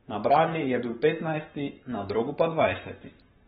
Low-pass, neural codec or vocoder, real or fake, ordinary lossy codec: 19.8 kHz; codec, 44.1 kHz, 7.8 kbps, Pupu-Codec; fake; AAC, 16 kbps